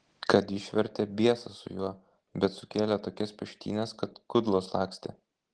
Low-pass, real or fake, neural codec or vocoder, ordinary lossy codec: 9.9 kHz; real; none; Opus, 16 kbps